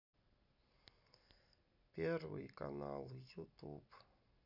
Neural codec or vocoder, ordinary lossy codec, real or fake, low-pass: none; none; real; 5.4 kHz